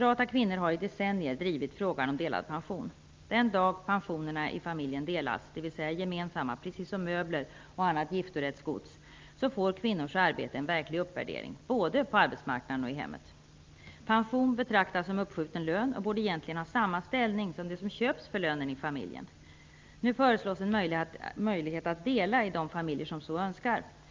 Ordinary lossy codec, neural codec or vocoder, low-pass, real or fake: Opus, 24 kbps; none; 7.2 kHz; real